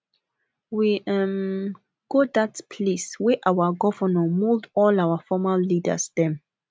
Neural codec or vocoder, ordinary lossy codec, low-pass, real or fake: none; none; none; real